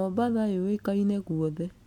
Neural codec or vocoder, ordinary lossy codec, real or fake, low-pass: none; MP3, 96 kbps; real; 19.8 kHz